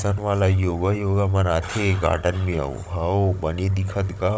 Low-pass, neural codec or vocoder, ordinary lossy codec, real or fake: none; codec, 16 kHz, 16 kbps, FunCodec, trained on Chinese and English, 50 frames a second; none; fake